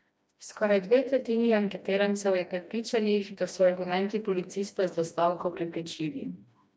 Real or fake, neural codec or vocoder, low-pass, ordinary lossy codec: fake; codec, 16 kHz, 1 kbps, FreqCodec, smaller model; none; none